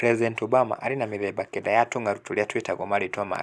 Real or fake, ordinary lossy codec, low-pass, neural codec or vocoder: real; none; none; none